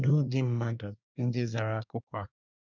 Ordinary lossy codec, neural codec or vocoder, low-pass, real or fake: none; codec, 24 kHz, 1 kbps, SNAC; 7.2 kHz; fake